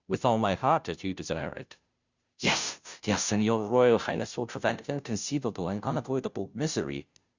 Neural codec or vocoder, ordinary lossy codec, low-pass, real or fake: codec, 16 kHz, 0.5 kbps, FunCodec, trained on Chinese and English, 25 frames a second; Opus, 64 kbps; 7.2 kHz; fake